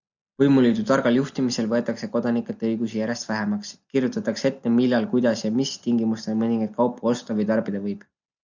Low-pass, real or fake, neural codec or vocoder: 7.2 kHz; real; none